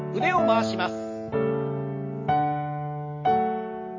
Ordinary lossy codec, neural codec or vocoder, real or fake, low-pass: MP3, 32 kbps; none; real; 7.2 kHz